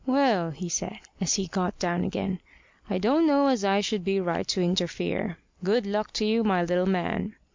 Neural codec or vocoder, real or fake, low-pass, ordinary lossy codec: none; real; 7.2 kHz; MP3, 64 kbps